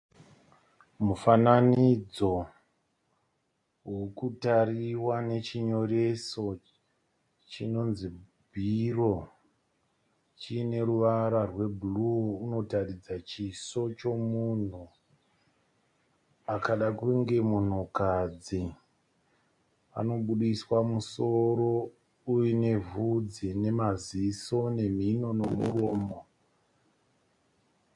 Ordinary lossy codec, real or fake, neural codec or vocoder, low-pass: MP3, 48 kbps; real; none; 10.8 kHz